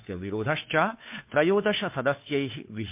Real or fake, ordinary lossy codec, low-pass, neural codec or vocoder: fake; MP3, 32 kbps; 3.6 kHz; codec, 16 kHz, 2 kbps, FunCodec, trained on Chinese and English, 25 frames a second